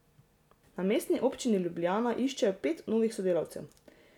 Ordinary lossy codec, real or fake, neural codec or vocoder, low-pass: none; real; none; 19.8 kHz